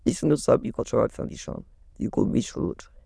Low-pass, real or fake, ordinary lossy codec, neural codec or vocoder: none; fake; none; autoencoder, 22.05 kHz, a latent of 192 numbers a frame, VITS, trained on many speakers